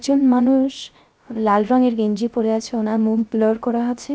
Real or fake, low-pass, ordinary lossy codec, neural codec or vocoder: fake; none; none; codec, 16 kHz, 0.3 kbps, FocalCodec